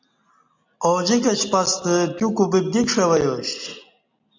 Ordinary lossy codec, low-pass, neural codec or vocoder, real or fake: MP3, 64 kbps; 7.2 kHz; none; real